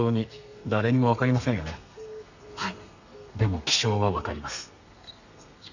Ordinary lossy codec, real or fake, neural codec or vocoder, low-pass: none; fake; codec, 32 kHz, 1.9 kbps, SNAC; 7.2 kHz